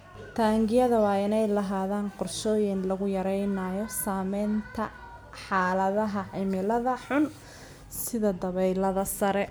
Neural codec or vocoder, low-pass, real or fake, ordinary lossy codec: none; none; real; none